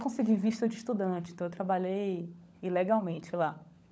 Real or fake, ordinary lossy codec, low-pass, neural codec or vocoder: fake; none; none; codec, 16 kHz, 16 kbps, FunCodec, trained on LibriTTS, 50 frames a second